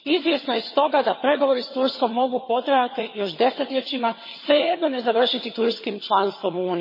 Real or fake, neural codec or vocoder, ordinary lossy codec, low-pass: fake; vocoder, 22.05 kHz, 80 mel bands, HiFi-GAN; MP3, 24 kbps; 5.4 kHz